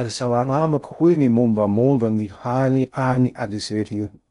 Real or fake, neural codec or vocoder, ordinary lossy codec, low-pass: fake; codec, 16 kHz in and 24 kHz out, 0.6 kbps, FocalCodec, streaming, 2048 codes; none; 10.8 kHz